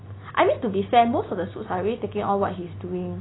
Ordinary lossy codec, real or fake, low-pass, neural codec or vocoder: AAC, 16 kbps; real; 7.2 kHz; none